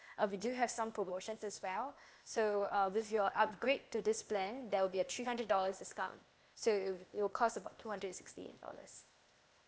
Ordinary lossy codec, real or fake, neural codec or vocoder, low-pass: none; fake; codec, 16 kHz, 0.8 kbps, ZipCodec; none